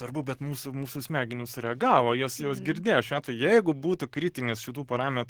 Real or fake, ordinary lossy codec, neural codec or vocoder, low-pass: fake; Opus, 16 kbps; codec, 44.1 kHz, 7.8 kbps, DAC; 19.8 kHz